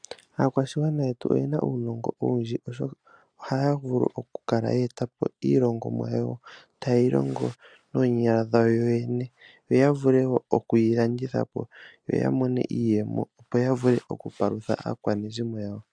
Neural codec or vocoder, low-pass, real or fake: none; 9.9 kHz; real